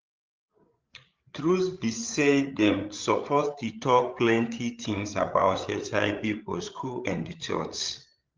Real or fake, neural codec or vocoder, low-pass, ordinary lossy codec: fake; codec, 16 kHz, 8 kbps, FreqCodec, larger model; 7.2 kHz; Opus, 24 kbps